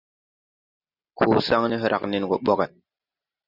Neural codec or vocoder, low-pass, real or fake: none; 5.4 kHz; real